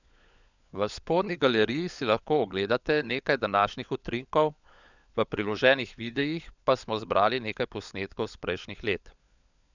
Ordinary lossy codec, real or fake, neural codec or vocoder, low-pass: none; fake; codec, 16 kHz, 16 kbps, FunCodec, trained on LibriTTS, 50 frames a second; 7.2 kHz